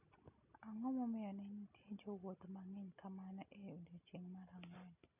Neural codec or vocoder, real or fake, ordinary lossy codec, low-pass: none; real; none; 3.6 kHz